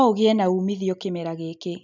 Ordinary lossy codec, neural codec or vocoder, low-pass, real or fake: none; none; 7.2 kHz; real